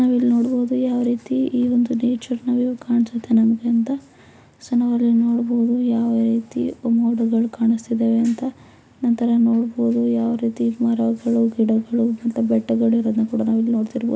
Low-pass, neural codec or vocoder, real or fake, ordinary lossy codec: none; none; real; none